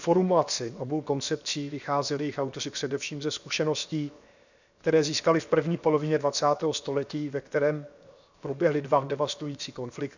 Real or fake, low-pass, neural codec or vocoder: fake; 7.2 kHz; codec, 16 kHz, 0.7 kbps, FocalCodec